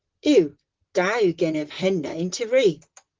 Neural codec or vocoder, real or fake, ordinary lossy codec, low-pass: vocoder, 44.1 kHz, 128 mel bands, Pupu-Vocoder; fake; Opus, 16 kbps; 7.2 kHz